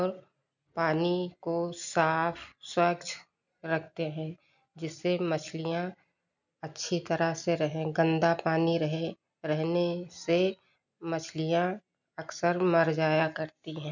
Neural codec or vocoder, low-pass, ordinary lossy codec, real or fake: none; 7.2 kHz; none; real